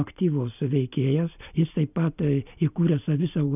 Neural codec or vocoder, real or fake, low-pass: none; real; 3.6 kHz